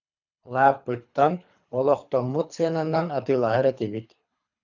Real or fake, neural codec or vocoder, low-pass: fake; codec, 24 kHz, 3 kbps, HILCodec; 7.2 kHz